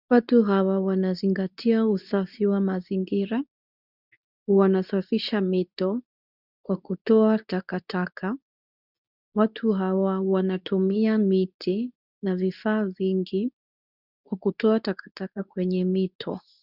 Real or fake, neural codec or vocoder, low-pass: fake; codec, 24 kHz, 0.9 kbps, WavTokenizer, medium speech release version 2; 5.4 kHz